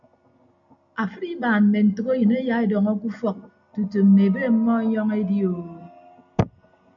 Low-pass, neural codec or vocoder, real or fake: 7.2 kHz; none; real